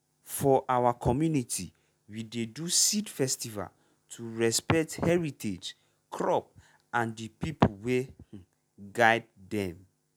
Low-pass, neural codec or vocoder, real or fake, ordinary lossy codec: none; none; real; none